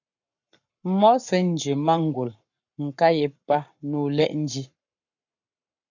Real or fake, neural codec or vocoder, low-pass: fake; codec, 44.1 kHz, 7.8 kbps, Pupu-Codec; 7.2 kHz